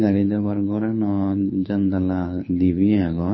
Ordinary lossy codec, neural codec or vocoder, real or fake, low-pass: MP3, 24 kbps; codec, 24 kHz, 6 kbps, HILCodec; fake; 7.2 kHz